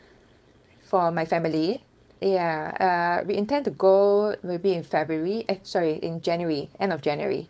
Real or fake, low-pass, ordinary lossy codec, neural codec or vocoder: fake; none; none; codec, 16 kHz, 4.8 kbps, FACodec